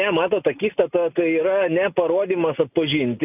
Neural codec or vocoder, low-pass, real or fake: none; 3.6 kHz; real